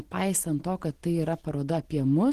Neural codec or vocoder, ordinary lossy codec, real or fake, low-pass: none; Opus, 16 kbps; real; 14.4 kHz